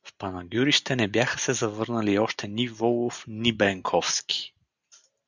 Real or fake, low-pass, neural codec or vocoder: real; 7.2 kHz; none